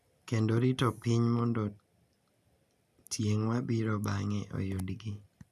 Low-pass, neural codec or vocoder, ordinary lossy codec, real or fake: 14.4 kHz; none; none; real